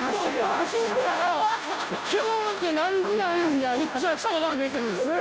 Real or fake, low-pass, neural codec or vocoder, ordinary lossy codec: fake; none; codec, 16 kHz, 0.5 kbps, FunCodec, trained on Chinese and English, 25 frames a second; none